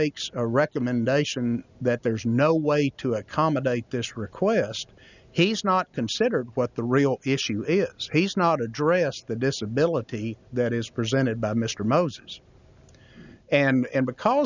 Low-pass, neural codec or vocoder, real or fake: 7.2 kHz; none; real